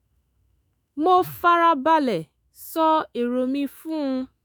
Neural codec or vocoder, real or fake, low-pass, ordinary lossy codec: autoencoder, 48 kHz, 128 numbers a frame, DAC-VAE, trained on Japanese speech; fake; none; none